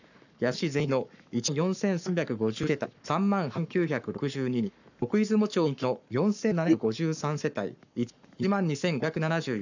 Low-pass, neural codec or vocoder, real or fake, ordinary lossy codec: 7.2 kHz; codec, 44.1 kHz, 7.8 kbps, Pupu-Codec; fake; none